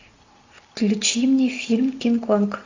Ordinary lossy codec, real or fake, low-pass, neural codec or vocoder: AAC, 48 kbps; real; 7.2 kHz; none